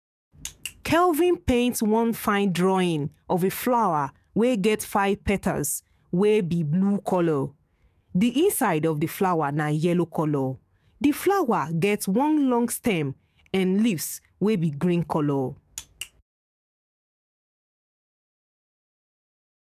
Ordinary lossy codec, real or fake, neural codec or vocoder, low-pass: none; fake; codec, 44.1 kHz, 7.8 kbps, DAC; 14.4 kHz